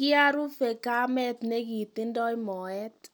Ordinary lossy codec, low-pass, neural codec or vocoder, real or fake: none; none; none; real